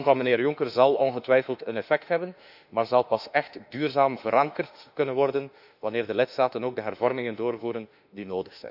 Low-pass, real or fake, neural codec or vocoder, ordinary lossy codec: 5.4 kHz; fake; autoencoder, 48 kHz, 32 numbers a frame, DAC-VAE, trained on Japanese speech; none